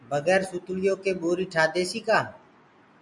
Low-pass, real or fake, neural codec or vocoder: 10.8 kHz; real; none